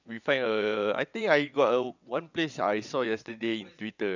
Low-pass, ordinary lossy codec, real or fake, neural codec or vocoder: 7.2 kHz; none; fake; vocoder, 22.05 kHz, 80 mel bands, WaveNeXt